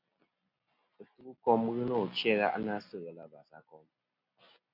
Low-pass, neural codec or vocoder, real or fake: 5.4 kHz; none; real